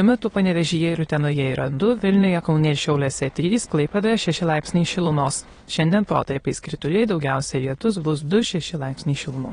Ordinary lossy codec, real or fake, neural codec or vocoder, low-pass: AAC, 32 kbps; fake; autoencoder, 22.05 kHz, a latent of 192 numbers a frame, VITS, trained on many speakers; 9.9 kHz